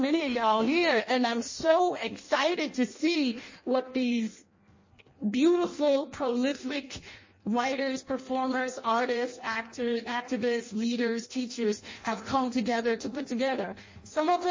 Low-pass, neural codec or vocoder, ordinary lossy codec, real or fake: 7.2 kHz; codec, 16 kHz in and 24 kHz out, 0.6 kbps, FireRedTTS-2 codec; MP3, 32 kbps; fake